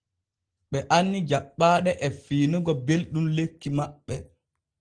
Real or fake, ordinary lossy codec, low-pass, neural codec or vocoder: real; Opus, 16 kbps; 9.9 kHz; none